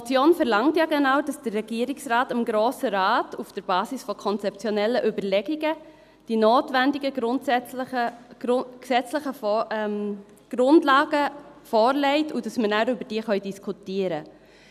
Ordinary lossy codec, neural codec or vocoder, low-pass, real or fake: none; none; 14.4 kHz; real